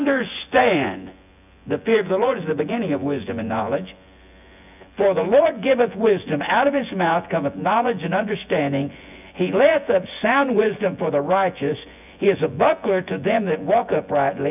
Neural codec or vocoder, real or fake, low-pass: vocoder, 24 kHz, 100 mel bands, Vocos; fake; 3.6 kHz